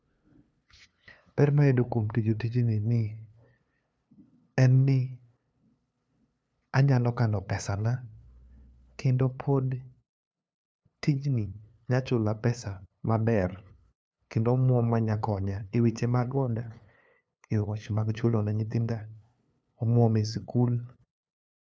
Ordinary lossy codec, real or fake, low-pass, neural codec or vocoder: none; fake; none; codec, 16 kHz, 2 kbps, FunCodec, trained on LibriTTS, 25 frames a second